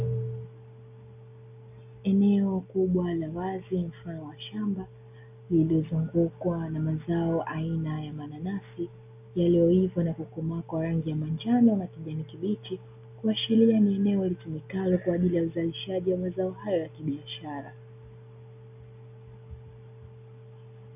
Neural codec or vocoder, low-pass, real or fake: none; 3.6 kHz; real